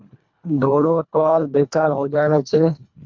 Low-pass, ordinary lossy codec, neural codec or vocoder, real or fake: 7.2 kHz; MP3, 64 kbps; codec, 24 kHz, 1.5 kbps, HILCodec; fake